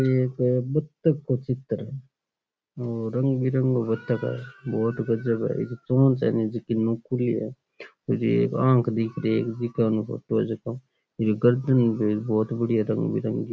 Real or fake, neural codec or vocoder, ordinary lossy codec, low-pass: real; none; none; none